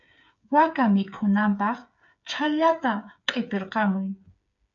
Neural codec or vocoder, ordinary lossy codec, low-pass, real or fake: codec, 16 kHz, 8 kbps, FreqCodec, smaller model; AAC, 64 kbps; 7.2 kHz; fake